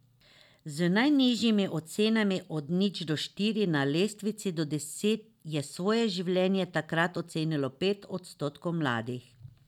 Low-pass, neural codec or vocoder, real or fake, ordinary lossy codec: 19.8 kHz; none; real; none